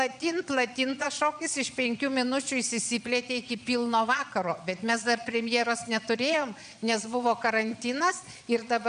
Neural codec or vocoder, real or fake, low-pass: vocoder, 22.05 kHz, 80 mel bands, Vocos; fake; 9.9 kHz